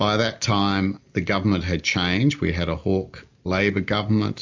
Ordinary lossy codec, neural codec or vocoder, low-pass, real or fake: MP3, 64 kbps; none; 7.2 kHz; real